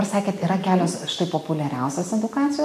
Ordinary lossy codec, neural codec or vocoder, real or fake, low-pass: AAC, 64 kbps; none; real; 14.4 kHz